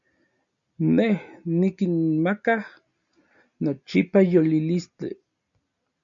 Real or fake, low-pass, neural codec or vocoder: real; 7.2 kHz; none